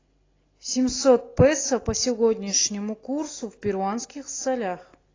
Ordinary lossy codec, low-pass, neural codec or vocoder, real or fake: AAC, 32 kbps; 7.2 kHz; none; real